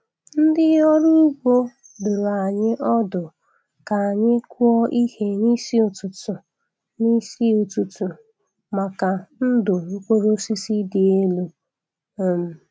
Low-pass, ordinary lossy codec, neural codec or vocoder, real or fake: none; none; none; real